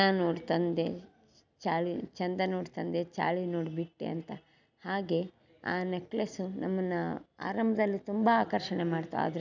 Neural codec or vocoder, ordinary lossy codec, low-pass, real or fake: none; none; 7.2 kHz; real